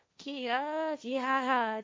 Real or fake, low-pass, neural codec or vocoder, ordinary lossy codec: fake; none; codec, 16 kHz, 1.1 kbps, Voila-Tokenizer; none